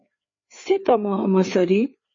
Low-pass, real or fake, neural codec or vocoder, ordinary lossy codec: 7.2 kHz; fake; codec, 16 kHz, 4 kbps, FreqCodec, larger model; MP3, 32 kbps